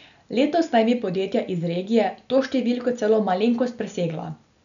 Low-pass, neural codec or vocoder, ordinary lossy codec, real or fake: 7.2 kHz; none; none; real